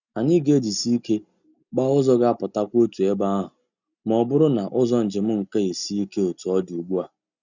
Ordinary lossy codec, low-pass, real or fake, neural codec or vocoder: none; 7.2 kHz; real; none